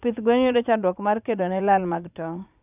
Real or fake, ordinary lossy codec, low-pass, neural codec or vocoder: fake; none; 3.6 kHz; vocoder, 44.1 kHz, 80 mel bands, Vocos